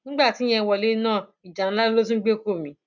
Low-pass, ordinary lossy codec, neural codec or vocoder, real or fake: 7.2 kHz; none; none; real